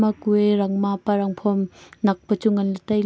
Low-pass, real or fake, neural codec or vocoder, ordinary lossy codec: none; real; none; none